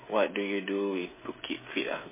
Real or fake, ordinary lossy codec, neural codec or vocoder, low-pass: fake; MP3, 16 kbps; codec, 16 kHz, 16 kbps, FreqCodec, smaller model; 3.6 kHz